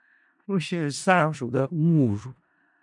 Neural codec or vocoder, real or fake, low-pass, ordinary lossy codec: codec, 16 kHz in and 24 kHz out, 0.4 kbps, LongCat-Audio-Codec, four codebook decoder; fake; 10.8 kHz; MP3, 96 kbps